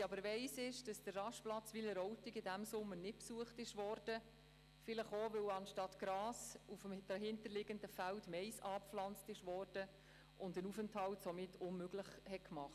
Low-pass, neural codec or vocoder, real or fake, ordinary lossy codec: 14.4 kHz; none; real; none